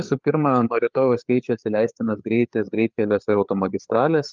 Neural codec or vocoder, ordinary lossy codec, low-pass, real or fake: codec, 16 kHz, 4 kbps, FreqCodec, larger model; Opus, 24 kbps; 7.2 kHz; fake